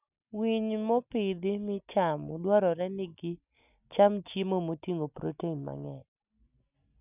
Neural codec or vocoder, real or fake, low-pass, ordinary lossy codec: none; real; 3.6 kHz; none